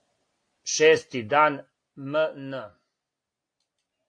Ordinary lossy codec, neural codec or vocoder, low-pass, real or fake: AAC, 48 kbps; none; 9.9 kHz; real